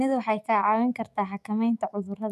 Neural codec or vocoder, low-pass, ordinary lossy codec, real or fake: autoencoder, 48 kHz, 128 numbers a frame, DAC-VAE, trained on Japanese speech; 14.4 kHz; none; fake